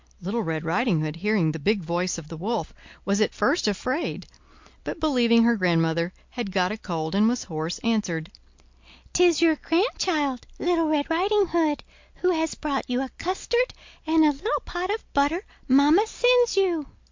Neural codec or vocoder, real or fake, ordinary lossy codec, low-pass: none; real; MP3, 48 kbps; 7.2 kHz